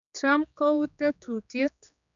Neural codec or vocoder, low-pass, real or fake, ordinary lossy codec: codec, 16 kHz, 2 kbps, X-Codec, HuBERT features, trained on general audio; 7.2 kHz; fake; none